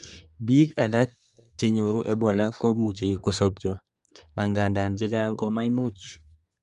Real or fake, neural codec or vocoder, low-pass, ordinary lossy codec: fake; codec, 24 kHz, 1 kbps, SNAC; 10.8 kHz; none